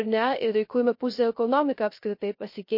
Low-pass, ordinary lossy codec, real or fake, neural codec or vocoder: 5.4 kHz; MP3, 32 kbps; fake; codec, 16 kHz, 0.3 kbps, FocalCodec